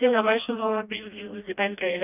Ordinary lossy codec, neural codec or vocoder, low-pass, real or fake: none; codec, 16 kHz, 1 kbps, FreqCodec, smaller model; 3.6 kHz; fake